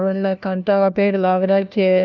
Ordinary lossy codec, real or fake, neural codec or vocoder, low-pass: none; fake; codec, 16 kHz, 1 kbps, FunCodec, trained on LibriTTS, 50 frames a second; 7.2 kHz